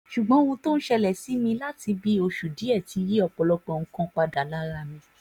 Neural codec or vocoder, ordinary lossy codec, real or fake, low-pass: none; none; real; 19.8 kHz